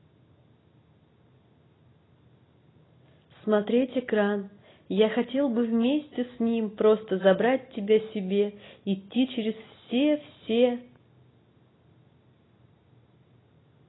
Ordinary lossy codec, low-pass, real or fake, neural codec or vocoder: AAC, 16 kbps; 7.2 kHz; real; none